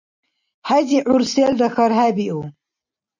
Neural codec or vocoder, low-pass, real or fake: none; 7.2 kHz; real